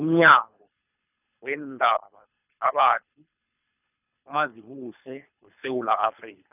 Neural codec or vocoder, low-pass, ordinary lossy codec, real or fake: codec, 24 kHz, 3 kbps, HILCodec; 3.6 kHz; none; fake